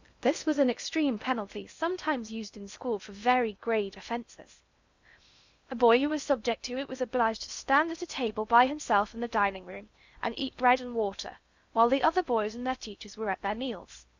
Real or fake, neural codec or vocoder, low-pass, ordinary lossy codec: fake; codec, 16 kHz in and 24 kHz out, 0.6 kbps, FocalCodec, streaming, 2048 codes; 7.2 kHz; Opus, 64 kbps